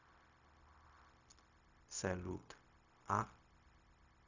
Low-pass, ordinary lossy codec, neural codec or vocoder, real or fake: 7.2 kHz; none; codec, 16 kHz, 0.4 kbps, LongCat-Audio-Codec; fake